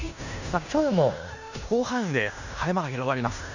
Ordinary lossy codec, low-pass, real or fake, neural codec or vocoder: none; 7.2 kHz; fake; codec, 16 kHz in and 24 kHz out, 0.9 kbps, LongCat-Audio-Codec, fine tuned four codebook decoder